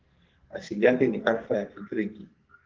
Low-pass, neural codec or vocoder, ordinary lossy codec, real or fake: 7.2 kHz; codec, 44.1 kHz, 2.6 kbps, SNAC; Opus, 16 kbps; fake